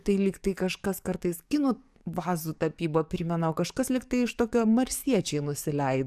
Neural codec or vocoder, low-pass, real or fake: codec, 44.1 kHz, 7.8 kbps, DAC; 14.4 kHz; fake